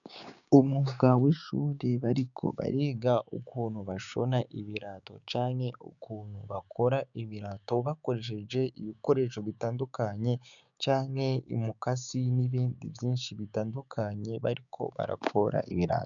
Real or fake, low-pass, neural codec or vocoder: fake; 7.2 kHz; codec, 16 kHz, 6 kbps, DAC